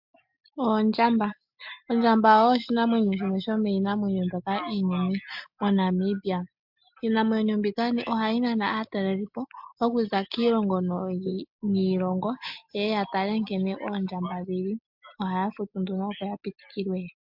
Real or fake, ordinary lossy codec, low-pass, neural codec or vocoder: real; MP3, 48 kbps; 5.4 kHz; none